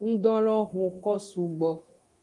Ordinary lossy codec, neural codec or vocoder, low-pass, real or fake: Opus, 24 kbps; codec, 24 kHz, 0.9 kbps, DualCodec; 10.8 kHz; fake